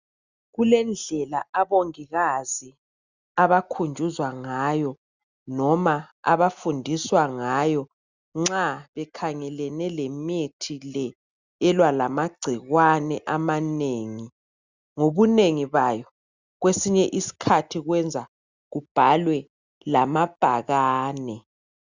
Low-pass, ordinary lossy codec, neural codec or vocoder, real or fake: 7.2 kHz; Opus, 64 kbps; none; real